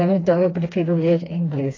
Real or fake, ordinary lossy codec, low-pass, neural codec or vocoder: fake; AAC, 32 kbps; 7.2 kHz; codec, 16 kHz, 2 kbps, FreqCodec, smaller model